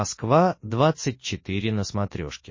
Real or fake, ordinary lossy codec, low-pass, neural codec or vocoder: real; MP3, 32 kbps; 7.2 kHz; none